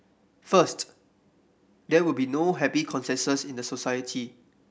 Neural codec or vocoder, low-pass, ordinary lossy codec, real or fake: none; none; none; real